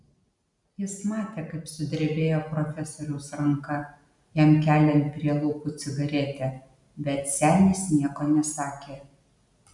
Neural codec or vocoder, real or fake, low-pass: none; real; 10.8 kHz